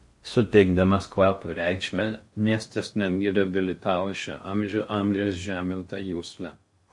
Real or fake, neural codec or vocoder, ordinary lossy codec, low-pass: fake; codec, 16 kHz in and 24 kHz out, 0.6 kbps, FocalCodec, streaming, 4096 codes; MP3, 48 kbps; 10.8 kHz